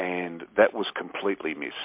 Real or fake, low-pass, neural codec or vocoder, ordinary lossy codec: real; 3.6 kHz; none; MP3, 32 kbps